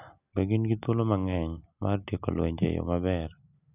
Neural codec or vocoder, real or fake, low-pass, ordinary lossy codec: none; real; 3.6 kHz; none